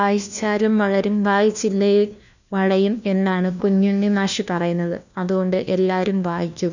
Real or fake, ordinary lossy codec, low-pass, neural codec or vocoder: fake; none; 7.2 kHz; codec, 16 kHz, 1 kbps, FunCodec, trained on LibriTTS, 50 frames a second